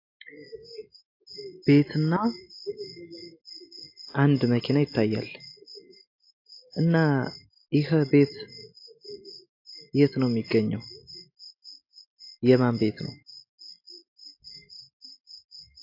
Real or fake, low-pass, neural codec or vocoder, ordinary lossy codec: real; 5.4 kHz; none; AAC, 32 kbps